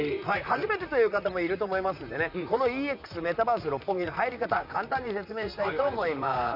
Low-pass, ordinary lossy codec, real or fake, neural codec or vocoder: 5.4 kHz; none; fake; vocoder, 44.1 kHz, 128 mel bands, Pupu-Vocoder